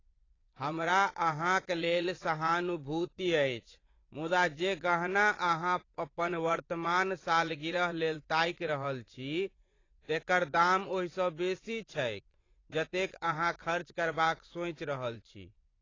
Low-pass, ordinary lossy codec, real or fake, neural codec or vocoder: 7.2 kHz; AAC, 32 kbps; fake; vocoder, 24 kHz, 100 mel bands, Vocos